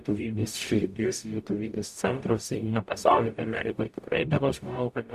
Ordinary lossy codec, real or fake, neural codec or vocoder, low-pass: Opus, 64 kbps; fake; codec, 44.1 kHz, 0.9 kbps, DAC; 14.4 kHz